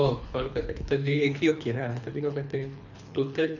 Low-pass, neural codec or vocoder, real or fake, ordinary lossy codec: 7.2 kHz; codec, 24 kHz, 3 kbps, HILCodec; fake; none